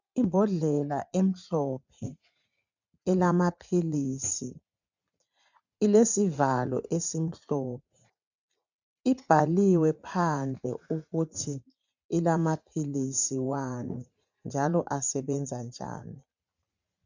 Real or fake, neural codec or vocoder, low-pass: fake; vocoder, 44.1 kHz, 80 mel bands, Vocos; 7.2 kHz